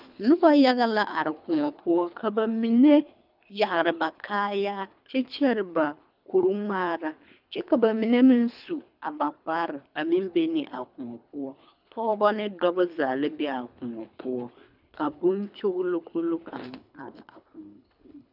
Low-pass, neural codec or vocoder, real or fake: 5.4 kHz; codec, 24 kHz, 3 kbps, HILCodec; fake